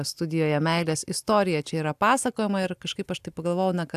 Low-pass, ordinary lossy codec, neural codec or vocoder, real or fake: 14.4 kHz; AAC, 96 kbps; none; real